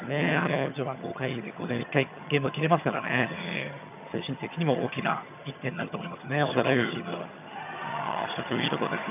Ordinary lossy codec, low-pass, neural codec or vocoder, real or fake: none; 3.6 kHz; vocoder, 22.05 kHz, 80 mel bands, HiFi-GAN; fake